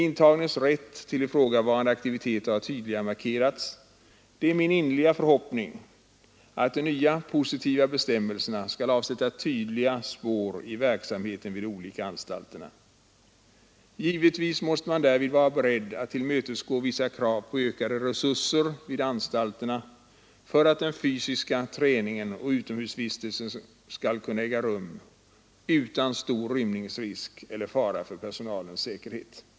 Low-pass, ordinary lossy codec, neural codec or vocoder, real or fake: none; none; none; real